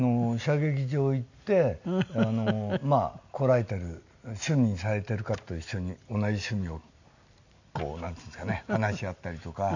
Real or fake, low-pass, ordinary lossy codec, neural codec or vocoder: real; 7.2 kHz; none; none